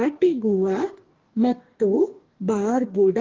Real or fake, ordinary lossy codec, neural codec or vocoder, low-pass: fake; Opus, 16 kbps; codec, 44.1 kHz, 2.6 kbps, DAC; 7.2 kHz